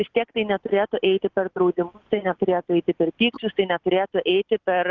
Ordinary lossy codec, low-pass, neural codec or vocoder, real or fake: Opus, 32 kbps; 7.2 kHz; none; real